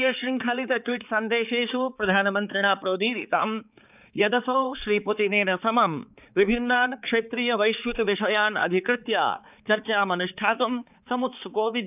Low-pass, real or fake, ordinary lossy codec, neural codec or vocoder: 3.6 kHz; fake; none; codec, 16 kHz, 4 kbps, X-Codec, HuBERT features, trained on balanced general audio